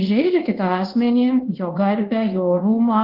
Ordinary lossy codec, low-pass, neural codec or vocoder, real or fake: Opus, 16 kbps; 5.4 kHz; codec, 24 kHz, 1.2 kbps, DualCodec; fake